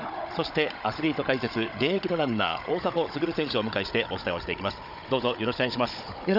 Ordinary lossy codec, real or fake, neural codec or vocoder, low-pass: none; fake; codec, 16 kHz, 16 kbps, FunCodec, trained on Chinese and English, 50 frames a second; 5.4 kHz